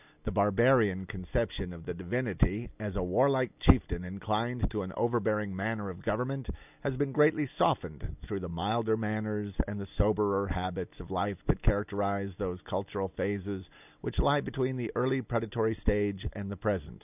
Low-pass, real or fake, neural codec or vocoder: 3.6 kHz; real; none